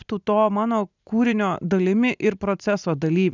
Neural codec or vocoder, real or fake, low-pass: none; real; 7.2 kHz